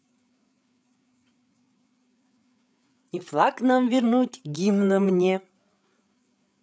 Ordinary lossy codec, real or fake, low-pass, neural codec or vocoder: none; fake; none; codec, 16 kHz, 8 kbps, FreqCodec, larger model